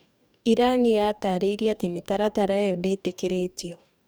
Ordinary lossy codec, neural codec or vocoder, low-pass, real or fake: none; codec, 44.1 kHz, 2.6 kbps, DAC; none; fake